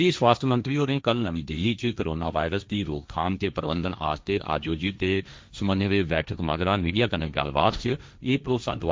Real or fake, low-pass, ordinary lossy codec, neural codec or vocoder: fake; none; none; codec, 16 kHz, 1.1 kbps, Voila-Tokenizer